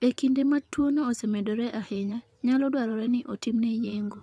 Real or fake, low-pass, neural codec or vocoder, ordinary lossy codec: fake; none; vocoder, 22.05 kHz, 80 mel bands, WaveNeXt; none